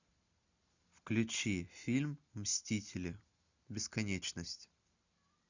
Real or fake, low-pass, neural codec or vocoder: real; 7.2 kHz; none